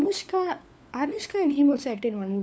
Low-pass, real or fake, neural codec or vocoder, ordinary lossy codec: none; fake; codec, 16 kHz, 4 kbps, FunCodec, trained on LibriTTS, 50 frames a second; none